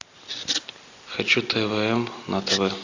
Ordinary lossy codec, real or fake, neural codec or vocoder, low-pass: AAC, 48 kbps; real; none; 7.2 kHz